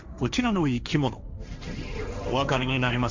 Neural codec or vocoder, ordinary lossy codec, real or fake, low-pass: codec, 16 kHz, 1.1 kbps, Voila-Tokenizer; none; fake; 7.2 kHz